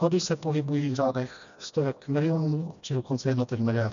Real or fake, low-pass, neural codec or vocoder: fake; 7.2 kHz; codec, 16 kHz, 1 kbps, FreqCodec, smaller model